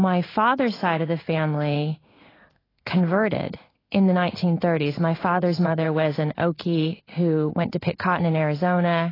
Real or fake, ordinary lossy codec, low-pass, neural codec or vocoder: fake; AAC, 24 kbps; 5.4 kHz; codec, 16 kHz in and 24 kHz out, 1 kbps, XY-Tokenizer